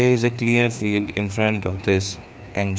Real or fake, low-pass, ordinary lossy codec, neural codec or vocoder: fake; none; none; codec, 16 kHz, 2 kbps, FreqCodec, larger model